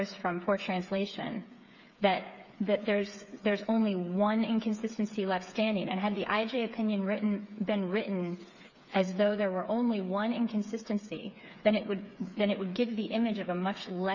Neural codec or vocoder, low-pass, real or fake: codec, 16 kHz, 8 kbps, FreqCodec, smaller model; 7.2 kHz; fake